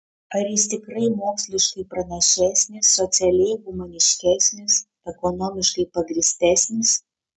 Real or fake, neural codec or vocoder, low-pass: real; none; 10.8 kHz